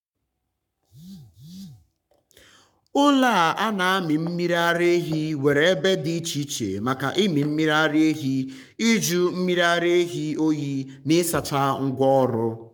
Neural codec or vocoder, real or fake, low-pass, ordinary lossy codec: codec, 44.1 kHz, 7.8 kbps, Pupu-Codec; fake; 19.8 kHz; none